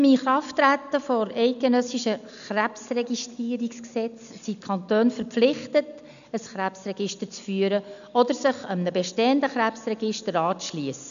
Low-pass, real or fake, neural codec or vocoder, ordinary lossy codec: 7.2 kHz; real; none; none